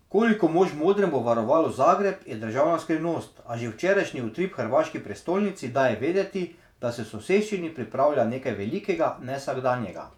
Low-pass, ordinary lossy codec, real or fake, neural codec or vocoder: 19.8 kHz; none; fake; vocoder, 48 kHz, 128 mel bands, Vocos